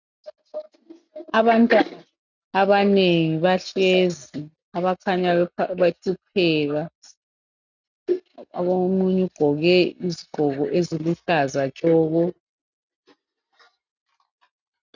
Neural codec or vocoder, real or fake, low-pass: none; real; 7.2 kHz